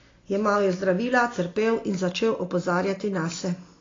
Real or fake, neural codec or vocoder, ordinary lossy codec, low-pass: real; none; AAC, 32 kbps; 7.2 kHz